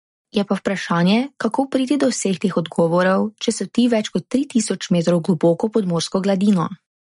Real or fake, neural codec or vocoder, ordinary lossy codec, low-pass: real; none; MP3, 48 kbps; 10.8 kHz